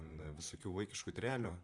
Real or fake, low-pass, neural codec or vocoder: fake; 10.8 kHz; vocoder, 44.1 kHz, 128 mel bands, Pupu-Vocoder